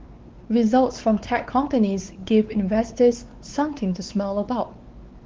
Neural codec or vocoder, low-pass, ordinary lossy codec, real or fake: codec, 16 kHz, 4 kbps, X-Codec, WavLM features, trained on Multilingual LibriSpeech; 7.2 kHz; Opus, 16 kbps; fake